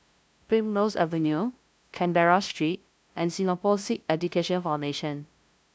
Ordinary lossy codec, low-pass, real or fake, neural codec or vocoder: none; none; fake; codec, 16 kHz, 0.5 kbps, FunCodec, trained on LibriTTS, 25 frames a second